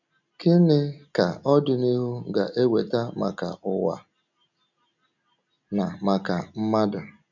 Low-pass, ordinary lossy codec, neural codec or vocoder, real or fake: 7.2 kHz; none; none; real